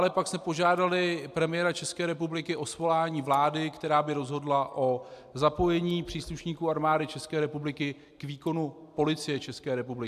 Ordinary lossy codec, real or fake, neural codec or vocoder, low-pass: AAC, 96 kbps; real; none; 14.4 kHz